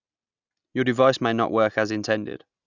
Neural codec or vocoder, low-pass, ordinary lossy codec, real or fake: none; 7.2 kHz; Opus, 64 kbps; real